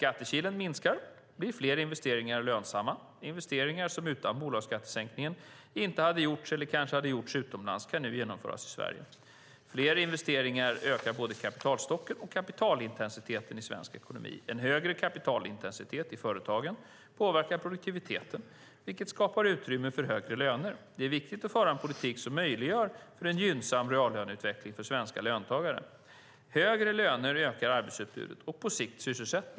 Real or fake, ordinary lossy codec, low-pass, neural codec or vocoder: real; none; none; none